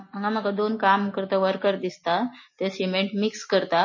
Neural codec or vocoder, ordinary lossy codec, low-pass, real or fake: none; MP3, 32 kbps; 7.2 kHz; real